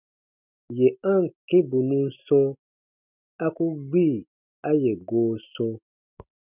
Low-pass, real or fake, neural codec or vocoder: 3.6 kHz; real; none